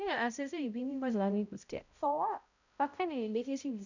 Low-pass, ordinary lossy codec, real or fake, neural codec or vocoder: 7.2 kHz; none; fake; codec, 16 kHz, 0.5 kbps, X-Codec, HuBERT features, trained on balanced general audio